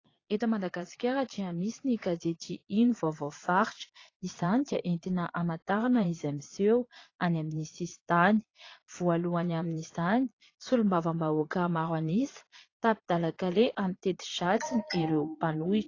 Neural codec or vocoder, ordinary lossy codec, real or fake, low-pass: vocoder, 22.05 kHz, 80 mel bands, Vocos; AAC, 32 kbps; fake; 7.2 kHz